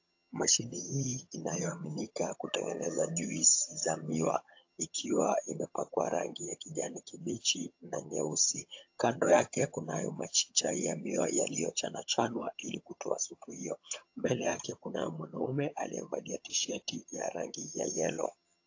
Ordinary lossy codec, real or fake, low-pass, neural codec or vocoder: AAC, 48 kbps; fake; 7.2 kHz; vocoder, 22.05 kHz, 80 mel bands, HiFi-GAN